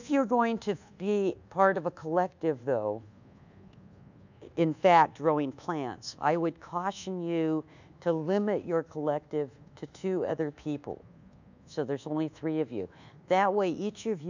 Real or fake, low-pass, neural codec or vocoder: fake; 7.2 kHz; codec, 24 kHz, 1.2 kbps, DualCodec